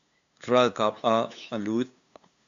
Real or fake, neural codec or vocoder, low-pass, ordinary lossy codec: fake; codec, 16 kHz, 2 kbps, FunCodec, trained on LibriTTS, 25 frames a second; 7.2 kHz; MP3, 64 kbps